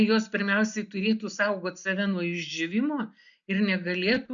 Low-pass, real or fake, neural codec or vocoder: 7.2 kHz; real; none